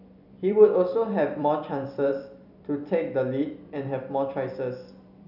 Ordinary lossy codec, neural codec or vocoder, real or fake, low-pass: none; vocoder, 44.1 kHz, 128 mel bands every 256 samples, BigVGAN v2; fake; 5.4 kHz